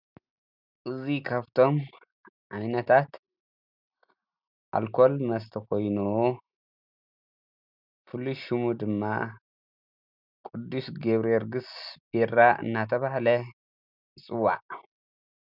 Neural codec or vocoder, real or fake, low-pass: none; real; 5.4 kHz